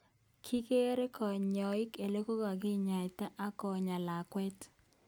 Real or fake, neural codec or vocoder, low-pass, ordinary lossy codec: real; none; none; none